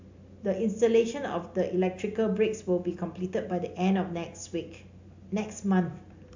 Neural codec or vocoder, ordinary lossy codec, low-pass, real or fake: none; AAC, 48 kbps; 7.2 kHz; real